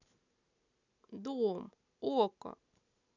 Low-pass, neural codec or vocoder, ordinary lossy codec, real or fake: 7.2 kHz; none; none; real